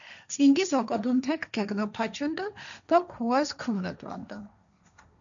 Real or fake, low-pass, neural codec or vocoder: fake; 7.2 kHz; codec, 16 kHz, 1.1 kbps, Voila-Tokenizer